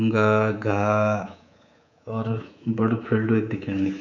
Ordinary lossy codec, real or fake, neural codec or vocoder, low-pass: none; fake; codec, 24 kHz, 3.1 kbps, DualCodec; 7.2 kHz